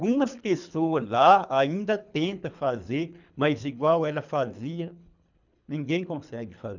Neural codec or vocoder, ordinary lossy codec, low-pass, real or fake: codec, 24 kHz, 3 kbps, HILCodec; none; 7.2 kHz; fake